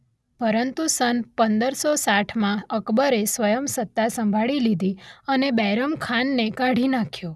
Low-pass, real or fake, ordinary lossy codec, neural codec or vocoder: none; real; none; none